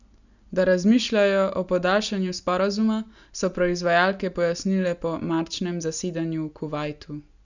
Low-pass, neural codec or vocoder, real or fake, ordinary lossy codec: 7.2 kHz; none; real; none